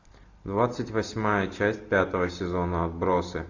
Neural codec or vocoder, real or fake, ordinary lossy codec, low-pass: none; real; AAC, 48 kbps; 7.2 kHz